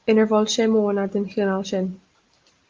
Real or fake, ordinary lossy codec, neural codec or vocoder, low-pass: real; Opus, 32 kbps; none; 7.2 kHz